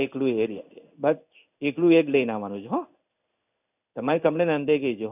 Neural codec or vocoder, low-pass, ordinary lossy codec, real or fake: codec, 16 kHz in and 24 kHz out, 1 kbps, XY-Tokenizer; 3.6 kHz; none; fake